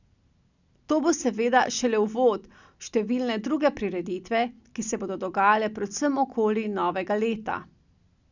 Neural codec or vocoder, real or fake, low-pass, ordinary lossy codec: none; real; 7.2 kHz; none